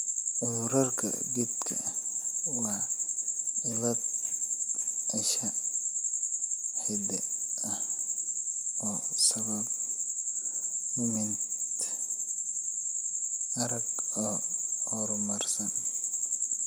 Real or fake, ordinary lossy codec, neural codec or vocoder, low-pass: real; none; none; none